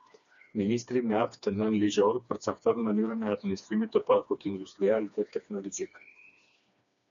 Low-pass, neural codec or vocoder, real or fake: 7.2 kHz; codec, 16 kHz, 2 kbps, FreqCodec, smaller model; fake